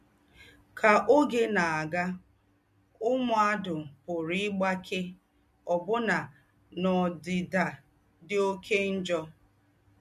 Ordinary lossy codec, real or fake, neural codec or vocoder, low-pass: MP3, 64 kbps; real; none; 14.4 kHz